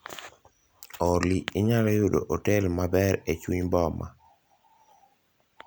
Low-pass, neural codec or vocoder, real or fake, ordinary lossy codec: none; none; real; none